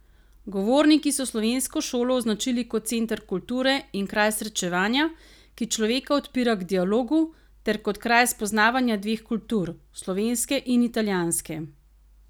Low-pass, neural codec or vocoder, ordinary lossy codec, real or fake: none; none; none; real